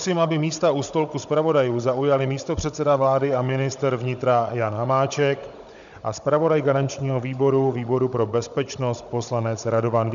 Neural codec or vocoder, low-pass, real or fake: codec, 16 kHz, 16 kbps, FunCodec, trained on Chinese and English, 50 frames a second; 7.2 kHz; fake